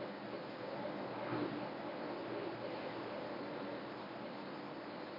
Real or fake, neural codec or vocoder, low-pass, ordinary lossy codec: fake; codec, 24 kHz, 0.9 kbps, WavTokenizer, medium speech release version 1; 5.4 kHz; MP3, 32 kbps